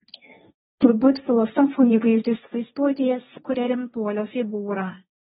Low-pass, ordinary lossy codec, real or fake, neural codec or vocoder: 7.2 kHz; AAC, 16 kbps; fake; codec, 16 kHz, 1.1 kbps, Voila-Tokenizer